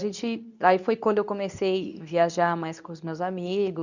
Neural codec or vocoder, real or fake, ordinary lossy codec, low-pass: codec, 24 kHz, 0.9 kbps, WavTokenizer, medium speech release version 2; fake; none; 7.2 kHz